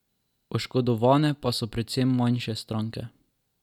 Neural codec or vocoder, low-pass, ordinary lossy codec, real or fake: none; 19.8 kHz; none; real